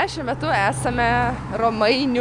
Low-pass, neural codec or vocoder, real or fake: 10.8 kHz; none; real